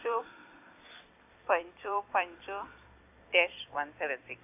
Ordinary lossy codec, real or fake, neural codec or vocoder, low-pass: AAC, 32 kbps; fake; vocoder, 44.1 kHz, 128 mel bands every 256 samples, BigVGAN v2; 3.6 kHz